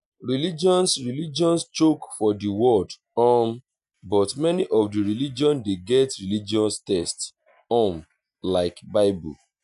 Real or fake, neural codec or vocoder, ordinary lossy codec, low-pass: real; none; none; 10.8 kHz